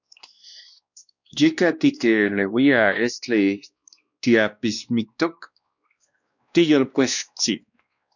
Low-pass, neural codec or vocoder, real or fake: 7.2 kHz; codec, 16 kHz, 1 kbps, X-Codec, WavLM features, trained on Multilingual LibriSpeech; fake